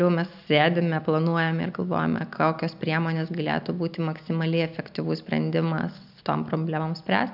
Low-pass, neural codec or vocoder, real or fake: 5.4 kHz; none; real